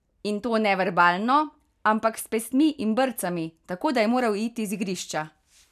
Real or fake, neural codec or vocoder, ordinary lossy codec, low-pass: real; none; none; 14.4 kHz